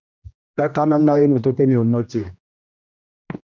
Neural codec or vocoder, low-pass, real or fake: codec, 16 kHz, 1 kbps, X-Codec, HuBERT features, trained on general audio; 7.2 kHz; fake